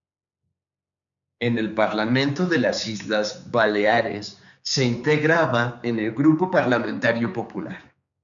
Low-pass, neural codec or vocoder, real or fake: 7.2 kHz; codec, 16 kHz, 4 kbps, X-Codec, HuBERT features, trained on general audio; fake